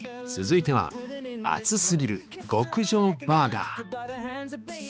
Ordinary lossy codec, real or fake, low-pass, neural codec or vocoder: none; fake; none; codec, 16 kHz, 2 kbps, X-Codec, HuBERT features, trained on balanced general audio